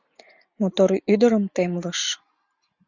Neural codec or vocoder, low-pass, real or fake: none; 7.2 kHz; real